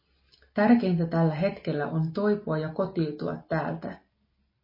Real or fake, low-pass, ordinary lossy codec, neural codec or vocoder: real; 5.4 kHz; MP3, 24 kbps; none